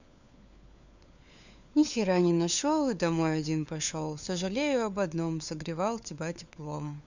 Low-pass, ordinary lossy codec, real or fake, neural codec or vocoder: 7.2 kHz; none; fake; codec, 16 kHz, 4 kbps, FunCodec, trained on LibriTTS, 50 frames a second